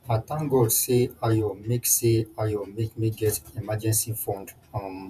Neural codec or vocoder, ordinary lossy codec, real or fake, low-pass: vocoder, 48 kHz, 128 mel bands, Vocos; none; fake; 14.4 kHz